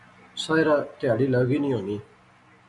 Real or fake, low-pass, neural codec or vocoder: real; 10.8 kHz; none